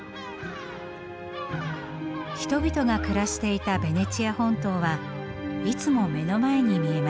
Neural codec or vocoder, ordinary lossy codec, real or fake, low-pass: none; none; real; none